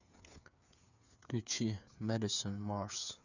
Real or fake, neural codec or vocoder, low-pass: fake; codec, 16 kHz, 8 kbps, FreqCodec, smaller model; 7.2 kHz